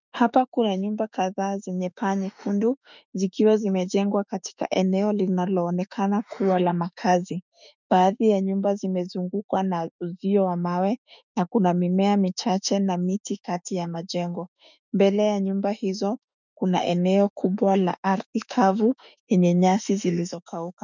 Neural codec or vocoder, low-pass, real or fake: autoencoder, 48 kHz, 32 numbers a frame, DAC-VAE, trained on Japanese speech; 7.2 kHz; fake